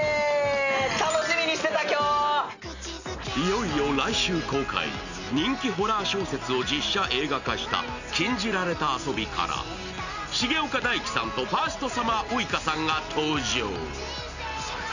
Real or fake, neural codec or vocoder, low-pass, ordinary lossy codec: real; none; 7.2 kHz; none